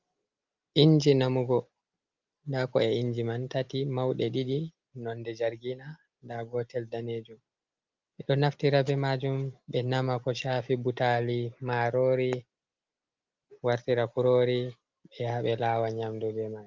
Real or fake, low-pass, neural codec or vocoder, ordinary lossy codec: real; 7.2 kHz; none; Opus, 32 kbps